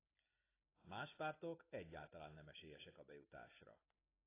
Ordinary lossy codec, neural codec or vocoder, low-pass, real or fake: AAC, 16 kbps; none; 3.6 kHz; real